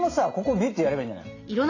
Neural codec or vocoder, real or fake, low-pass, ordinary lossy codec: none; real; 7.2 kHz; AAC, 32 kbps